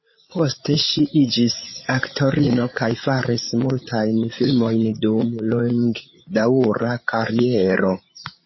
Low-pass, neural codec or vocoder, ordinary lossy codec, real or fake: 7.2 kHz; codec, 16 kHz, 8 kbps, FreqCodec, larger model; MP3, 24 kbps; fake